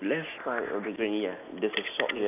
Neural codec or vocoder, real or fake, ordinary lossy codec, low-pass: none; real; none; 3.6 kHz